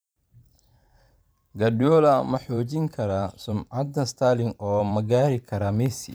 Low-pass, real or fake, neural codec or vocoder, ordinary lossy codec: none; fake; vocoder, 44.1 kHz, 128 mel bands every 512 samples, BigVGAN v2; none